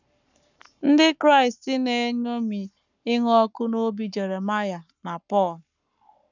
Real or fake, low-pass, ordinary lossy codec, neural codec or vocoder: fake; 7.2 kHz; none; codec, 44.1 kHz, 7.8 kbps, Pupu-Codec